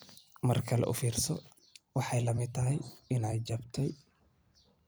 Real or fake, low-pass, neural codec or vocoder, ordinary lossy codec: fake; none; vocoder, 44.1 kHz, 128 mel bands every 256 samples, BigVGAN v2; none